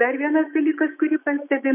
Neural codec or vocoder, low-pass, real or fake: autoencoder, 48 kHz, 128 numbers a frame, DAC-VAE, trained on Japanese speech; 3.6 kHz; fake